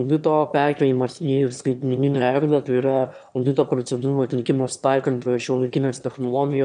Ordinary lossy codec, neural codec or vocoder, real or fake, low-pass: MP3, 96 kbps; autoencoder, 22.05 kHz, a latent of 192 numbers a frame, VITS, trained on one speaker; fake; 9.9 kHz